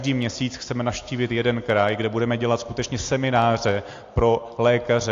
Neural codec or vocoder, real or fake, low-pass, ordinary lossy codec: none; real; 7.2 kHz; AAC, 48 kbps